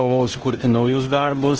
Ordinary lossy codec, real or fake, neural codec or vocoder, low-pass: Opus, 16 kbps; fake; codec, 16 kHz in and 24 kHz out, 0.9 kbps, LongCat-Audio-Codec, fine tuned four codebook decoder; 7.2 kHz